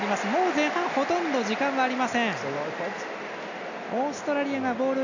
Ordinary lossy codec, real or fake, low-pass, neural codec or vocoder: none; real; 7.2 kHz; none